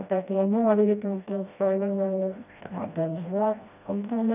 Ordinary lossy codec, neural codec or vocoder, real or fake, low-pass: none; codec, 16 kHz, 1 kbps, FreqCodec, smaller model; fake; 3.6 kHz